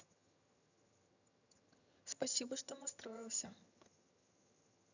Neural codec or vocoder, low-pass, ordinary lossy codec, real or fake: vocoder, 22.05 kHz, 80 mel bands, HiFi-GAN; 7.2 kHz; none; fake